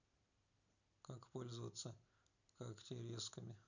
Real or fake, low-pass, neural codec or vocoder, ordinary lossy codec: real; 7.2 kHz; none; none